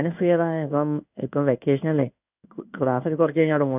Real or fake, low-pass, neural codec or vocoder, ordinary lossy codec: fake; 3.6 kHz; codec, 16 kHz in and 24 kHz out, 1 kbps, XY-Tokenizer; none